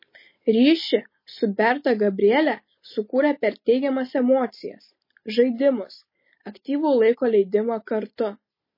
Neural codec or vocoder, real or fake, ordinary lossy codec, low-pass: none; real; MP3, 24 kbps; 5.4 kHz